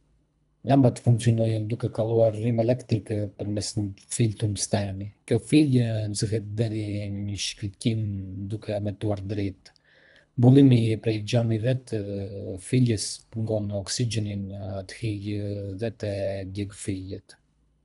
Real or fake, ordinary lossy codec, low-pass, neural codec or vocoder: fake; none; 10.8 kHz; codec, 24 kHz, 3 kbps, HILCodec